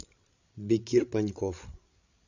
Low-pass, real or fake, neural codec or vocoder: 7.2 kHz; fake; codec, 16 kHz, 8 kbps, FreqCodec, larger model